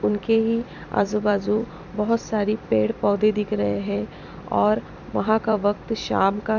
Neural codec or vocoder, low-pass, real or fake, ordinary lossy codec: vocoder, 44.1 kHz, 128 mel bands every 256 samples, BigVGAN v2; 7.2 kHz; fake; none